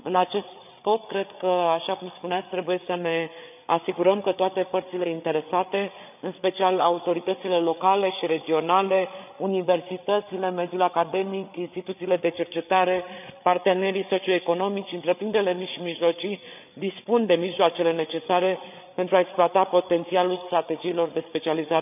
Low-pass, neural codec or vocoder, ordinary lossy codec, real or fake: 3.6 kHz; codec, 16 kHz, 8 kbps, FreqCodec, larger model; none; fake